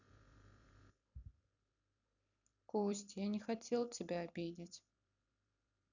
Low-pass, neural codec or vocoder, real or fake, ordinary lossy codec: 7.2 kHz; vocoder, 44.1 kHz, 128 mel bands every 256 samples, BigVGAN v2; fake; none